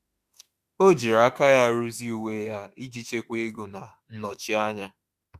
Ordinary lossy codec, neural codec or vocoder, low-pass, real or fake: Opus, 64 kbps; autoencoder, 48 kHz, 32 numbers a frame, DAC-VAE, trained on Japanese speech; 14.4 kHz; fake